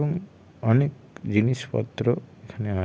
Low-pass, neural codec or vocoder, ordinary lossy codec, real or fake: none; none; none; real